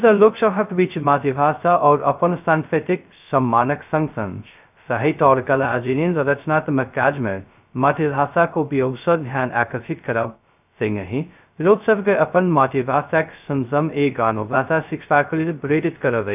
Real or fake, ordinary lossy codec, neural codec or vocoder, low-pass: fake; none; codec, 16 kHz, 0.2 kbps, FocalCodec; 3.6 kHz